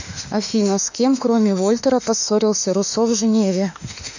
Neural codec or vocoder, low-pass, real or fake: autoencoder, 48 kHz, 32 numbers a frame, DAC-VAE, trained on Japanese speech; 7.2 kHz; fake